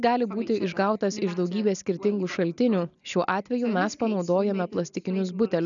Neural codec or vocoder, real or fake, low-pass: none; real; 7.2 kHz